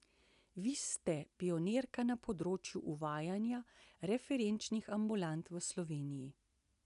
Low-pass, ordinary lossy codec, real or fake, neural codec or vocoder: 10.8 kHz; none; real; none